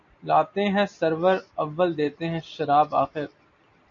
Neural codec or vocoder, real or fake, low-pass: none; real; 7.2 kHz